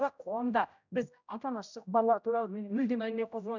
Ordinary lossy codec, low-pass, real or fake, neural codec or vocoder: none; 7.2 kHz; fake; codec, 16 kHz, 0.5 kbps, X-Codec, HuBERT features, trained on general audio